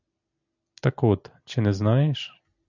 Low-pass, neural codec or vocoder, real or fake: 7.2 kHz; none; real